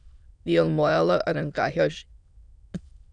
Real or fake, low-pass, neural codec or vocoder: fake; 9.9 kHz; autoencoder, 22.05 kHz, a latent of 192 numbers a frame, VITS, trained on many speakers